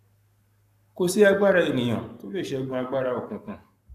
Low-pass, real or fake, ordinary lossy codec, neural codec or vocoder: 14.4 kHz; fake; none; codec, 44.1 kHz, 7.8 kbps, Pupu-Codec